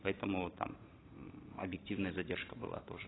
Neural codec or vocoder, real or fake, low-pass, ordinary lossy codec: vocoder, 44.1 kHz, 128 mel bands every 512 samples, BigVGAN v2; fake; 7.2 kHz; AAC, 16 kbps